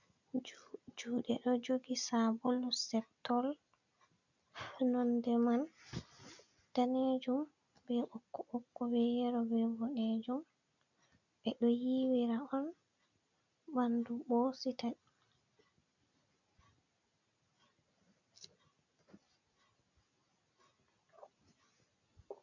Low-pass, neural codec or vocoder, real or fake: 7.2 kHz; none; real